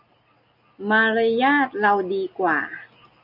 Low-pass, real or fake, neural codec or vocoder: 5.4 kHz; real; none